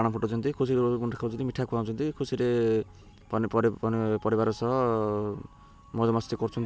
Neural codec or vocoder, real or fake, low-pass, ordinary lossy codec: none; real; none; none